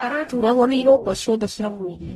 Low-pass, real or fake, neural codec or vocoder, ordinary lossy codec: 19.8 kHz; fake; codec, 44.1 kHz, 0.9 kbps, DAC; AAC, 32 kbps